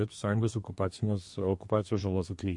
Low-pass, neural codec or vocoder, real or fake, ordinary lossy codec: 10.8 kHz; codec, 24 kHz, 1 kbps, SNAC; fake; MP3, 48 kbps